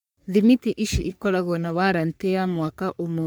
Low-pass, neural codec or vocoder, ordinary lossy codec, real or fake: none; codec, 44.1 kHz, 3.4 kbps, Pupu-Codec; none; fake